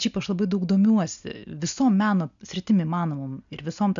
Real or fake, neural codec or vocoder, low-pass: real; none; 7.2 kHz